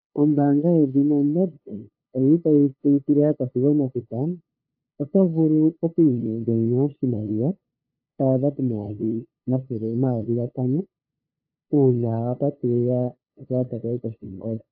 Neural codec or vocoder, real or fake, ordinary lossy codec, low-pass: codec, 16 kHz, 2 kbps, FunCodec, trained on LibriTTS, 25 frames a second; fake; AAC, 48 kbps; 5.4 kHz